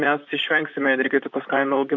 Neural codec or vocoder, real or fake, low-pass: vocoder, 44.1 kHz, 128 mel bands, Pupu-Vocoder; fake; 7.2 kHz